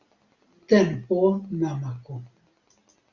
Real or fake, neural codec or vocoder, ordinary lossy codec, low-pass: real; none; Opus, 64 kbps; 7.2 kHz